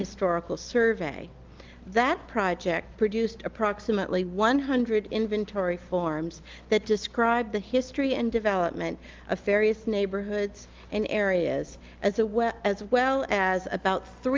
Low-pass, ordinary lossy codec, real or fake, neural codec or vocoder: 7.2 kHz; Opus, 32 kbps; real; none